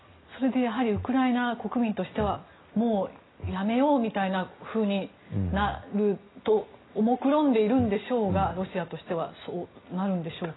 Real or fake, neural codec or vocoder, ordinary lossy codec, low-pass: real; none; AAC, 16 kbps; 7.2 kHz